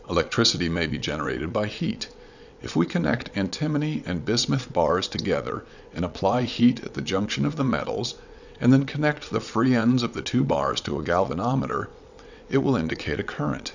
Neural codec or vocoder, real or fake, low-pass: vocoder, 22.05 kHz, 80 mel bands, WaveNeXt; fake; 7.2 kHz